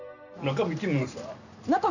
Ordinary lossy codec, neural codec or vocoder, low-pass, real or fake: none; none; 7.2 kHz; real